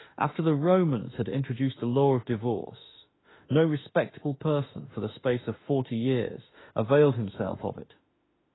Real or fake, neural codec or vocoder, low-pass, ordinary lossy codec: fake; autoencoder, 48 kHz, 32 numbers a frame, DAC-VAE, trained on Japanese speech; 7.2 kHz; AAC, 16 kbps